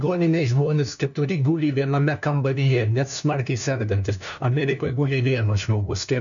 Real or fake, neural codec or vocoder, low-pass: fake; codec, 16 kHz, 1 kbps, FunCodec, trained on LibriTTS, 50 frames a second; 7.2 kHz